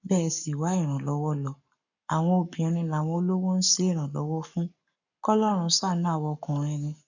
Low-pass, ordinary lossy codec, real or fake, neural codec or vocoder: 7.2 kHz; none; fake; codec, 44.1 kHz, 7.8 kbps, Pupu-Codec